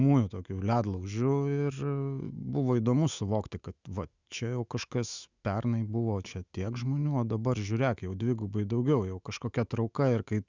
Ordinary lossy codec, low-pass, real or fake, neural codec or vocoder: Opus, 64 kbps; 7.2 kHz; fake; autoencoder, 48 kHz, 128 numbers a frame, DAC-VAE, trained on Japanese speech